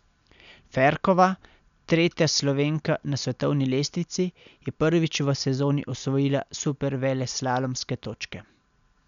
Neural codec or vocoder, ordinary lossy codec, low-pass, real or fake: none; none; 7.2 kHz; real